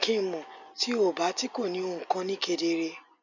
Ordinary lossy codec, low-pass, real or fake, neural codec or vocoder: none; 7.2 kHz; real; none